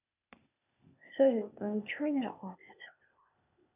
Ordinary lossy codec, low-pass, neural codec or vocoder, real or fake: none; 3.6 kHz; codec, 16 kHz, 0.8 kbps, ZipCodec; fake